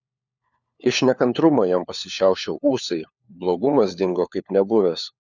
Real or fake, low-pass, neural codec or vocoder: fake; 7.2 kHz; codec, 16 kHz, 4 kbps, FunCodec, trained on LibriTTS, 50 frames a second